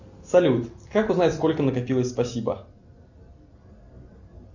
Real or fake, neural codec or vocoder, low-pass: real; none; 7.2 kHz